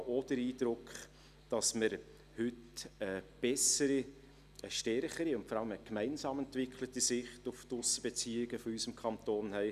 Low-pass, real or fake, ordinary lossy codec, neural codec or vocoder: 14.4 kHz; real; none; none